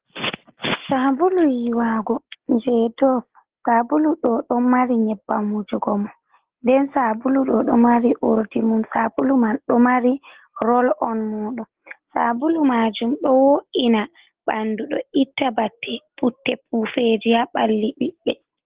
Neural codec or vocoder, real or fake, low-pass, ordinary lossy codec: none; real; 3.6 kHz; Opus, 16 kbps